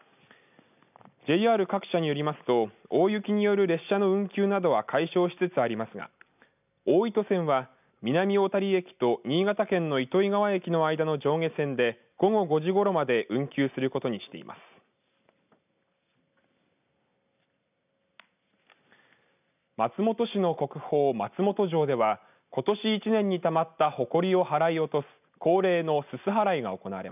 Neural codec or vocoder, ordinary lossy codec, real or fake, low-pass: none; none; real; 3.6 kHz